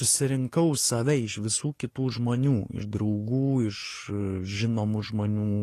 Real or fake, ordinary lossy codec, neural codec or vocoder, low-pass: fake; AAC, 48 kbps; codec, 44.1 kHz, 7.8 kbps, DAC; 14.4 kHz